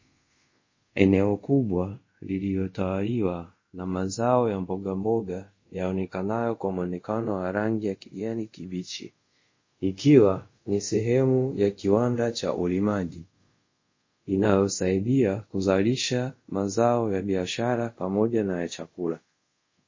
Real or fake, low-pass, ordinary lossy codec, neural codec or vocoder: fake; 7.2 kHz; MP3, 32 kbps; codec, 24 kHz, 0.5 kbps, DualCodec